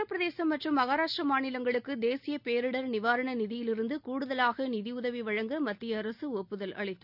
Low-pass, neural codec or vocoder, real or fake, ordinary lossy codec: 5.4 kHz; none; real; none